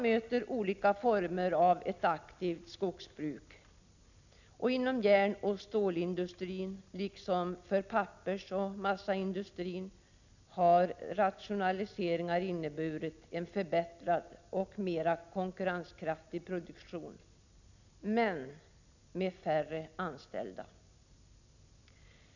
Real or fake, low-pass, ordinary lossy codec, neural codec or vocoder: real; 7.2 kHz; none; none